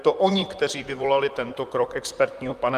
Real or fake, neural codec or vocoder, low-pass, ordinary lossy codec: fake; vocoder, 44.1 kHz, 128 mel bands, Pupu-Vocoder; 14.4 kHz; Opus, 32 kbps